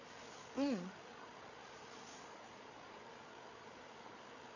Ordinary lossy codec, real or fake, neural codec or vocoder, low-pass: none; fake; codec, 16 kHz, 8 kbps, FreqCodec, larger model; 7.2 kHz